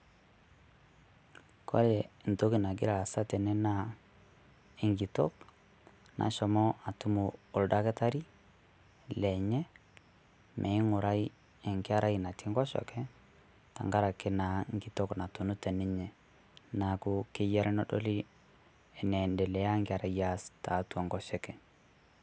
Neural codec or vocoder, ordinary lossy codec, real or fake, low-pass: none; none; real; none